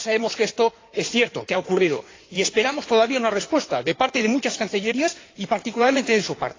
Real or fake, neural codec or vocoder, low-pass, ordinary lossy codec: fake; codec, 16 kHz in and 24 kHz out, 2.2 kbps, FireRedTTS-2 codec; 7.2 kHz; AAC, 32 kbps